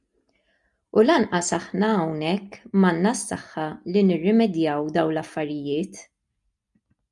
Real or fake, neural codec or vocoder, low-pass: real; none; 10.8 kHz